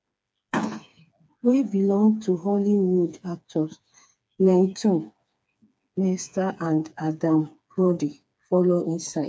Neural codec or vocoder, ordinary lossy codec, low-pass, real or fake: codec, 16 kHz, 4 kbps, FreqCodec, smaller model; none; none; fake